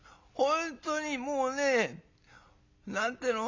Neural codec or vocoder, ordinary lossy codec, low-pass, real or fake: none; MP3, 64 kbps; 7.2 kHz; real